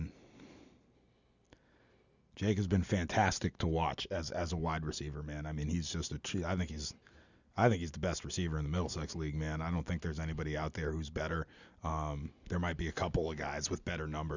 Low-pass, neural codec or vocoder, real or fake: 7.2 kHz; none; real